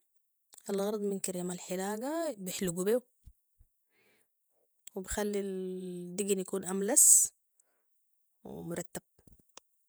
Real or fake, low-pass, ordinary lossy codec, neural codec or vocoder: fake; none; none; vocoder, 48 kHz, 128 mel bands, Vocos